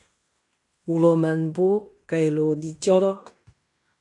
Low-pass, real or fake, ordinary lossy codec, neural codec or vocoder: 10.8 kHz; fake; AAC, 64 kbps; codec, 16 kHz in and 24 kHz out, 0.9 kbps, LongCat-Audio-Codec, fine tuned four codebook decoder